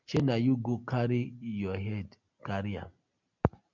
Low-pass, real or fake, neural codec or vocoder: 7.2 kHz; real; none